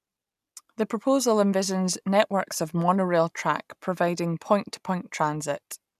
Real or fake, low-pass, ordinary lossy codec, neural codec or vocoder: real; 14.4 kHz; none; none